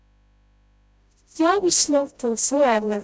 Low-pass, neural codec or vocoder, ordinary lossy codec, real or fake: none; codec, 16 kHz, 0.5 kbps, FreqCodec, smaller model; none; fake